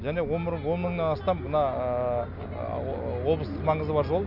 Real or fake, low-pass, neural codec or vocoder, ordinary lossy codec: real; 5.4 kHz; none; none